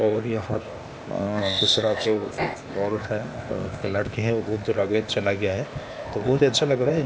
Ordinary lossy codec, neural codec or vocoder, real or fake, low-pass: none; codec, 16 kHz, 0.8 kbps, ZipCodec; fake; none